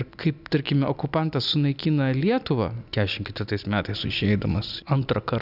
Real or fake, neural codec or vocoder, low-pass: real; none; 5.4 kHz